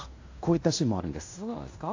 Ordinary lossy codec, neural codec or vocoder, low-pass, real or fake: AAC, 48 kbps; codec, 16 kHz in and 24 kHz out, 0.9 kbps, LongCat-Audio-Codec, fine tuned four codebook decoder; 7.2 kHz; fake